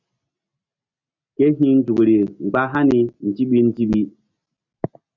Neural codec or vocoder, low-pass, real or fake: none; 7.2 kHz; real